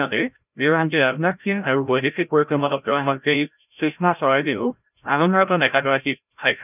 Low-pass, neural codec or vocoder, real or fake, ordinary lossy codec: 3.6 kHz; codec, 16 kHz, 0.5 kbps, FreqCodec, larger model; fake; none